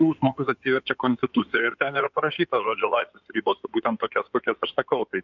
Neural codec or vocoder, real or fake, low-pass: codec, 16 kHz in and 24 kHz out, 2.2 kbps, FireRedTTS-2 codec; fake; 7.2 kHz